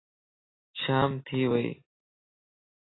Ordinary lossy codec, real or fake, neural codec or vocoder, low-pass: AAC, 16 kbps; real; none; 7.2 kHz